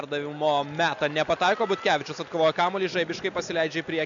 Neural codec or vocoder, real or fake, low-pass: none; real; 7.2 kHz